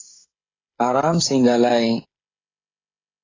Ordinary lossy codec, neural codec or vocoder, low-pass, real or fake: AAC, 32 kbps; codec, 16 kHz, 16 kbps, FreqCodec, smaller model; 7.2 kHz; fake